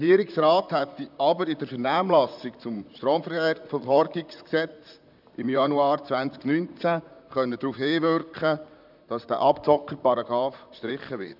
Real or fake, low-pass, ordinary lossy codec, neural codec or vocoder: fake; 5.4 kHz; none; vocoder, 44.1 kHz, 128 mel bands, Pupu-Vocoder